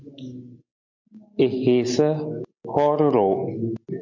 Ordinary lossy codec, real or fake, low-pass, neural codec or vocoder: MP3, 48 kbps; real; 7.2 kHz; none